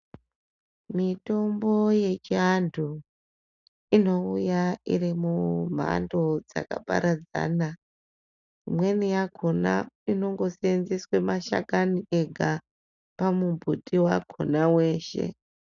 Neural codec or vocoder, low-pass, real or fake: none; 7.2 kHz; real